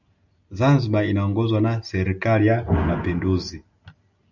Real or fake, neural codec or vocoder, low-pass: real; none; 7.2 kHz